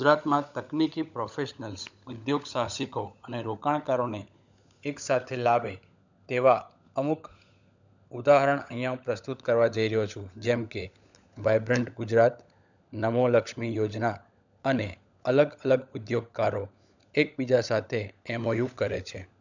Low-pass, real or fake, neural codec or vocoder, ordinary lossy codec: 7.2 kHz; fake; codec, 16 kHz, 16 kbps, FunCodec, trained on LibriTTS, 50 frames a second; none